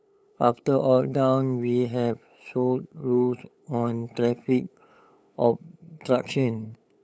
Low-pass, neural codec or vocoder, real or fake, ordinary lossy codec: none; codec, 16 kHz, 8 kbps, FunCodec, trained on LibriTTS, 25 frames a second; fake; none